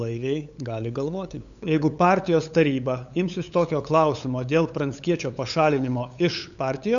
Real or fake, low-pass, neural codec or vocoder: fake; 7.2 kHz; codec, 16 kHz, 8 kbps, FunCodec, trained on LibriTTS, 25 frames a second